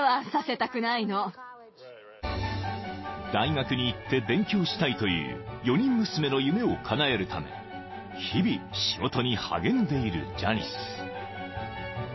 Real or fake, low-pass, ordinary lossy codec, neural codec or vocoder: real; 7.2 kHz; MP3, 24 kbps; none